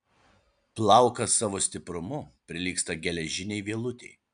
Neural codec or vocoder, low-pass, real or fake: none; 9.9 kHz; real